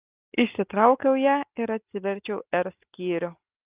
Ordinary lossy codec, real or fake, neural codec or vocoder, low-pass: Opus, 24 kbps; real; none; 3.6 kHz